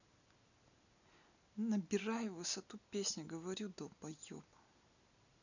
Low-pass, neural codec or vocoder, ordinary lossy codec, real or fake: 7.2 kHz; none; none; real